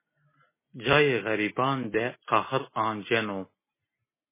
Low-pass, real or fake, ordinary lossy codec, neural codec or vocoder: 3.6 kHz; real; MP3, 16 kbps; none